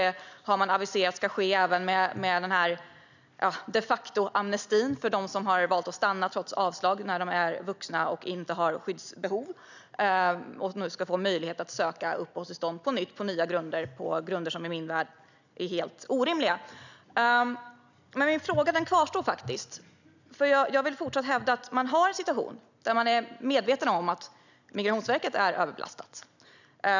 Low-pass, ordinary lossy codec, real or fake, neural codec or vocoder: 7.2 kHz; none; real; none